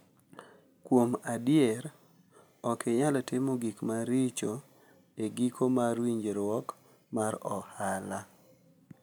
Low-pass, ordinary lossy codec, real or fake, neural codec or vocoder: none; none; real; none